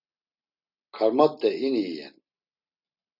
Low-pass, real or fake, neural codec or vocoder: 5.4 kHz; real; none